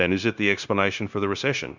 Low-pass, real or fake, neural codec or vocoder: 7.2 kHz; fake; codec, 16 kHz, 0.9 kbps, LongCat-Audio-Codec